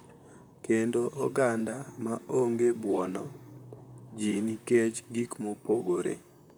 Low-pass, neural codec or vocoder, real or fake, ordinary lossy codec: none; vocoder, 44.1 kHz, 128 mel bands, Pupu-Vocoder; fake; none